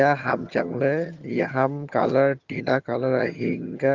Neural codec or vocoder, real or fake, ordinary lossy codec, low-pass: vocoder, 22.05 kHz, 80 mel bands, HiFi-GAN; fake; Opus, 32 kbps; 7.2 kHz